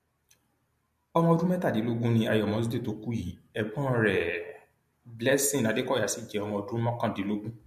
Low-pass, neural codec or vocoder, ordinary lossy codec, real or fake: 14.4 kHz; none; MP3, 64 kbps; real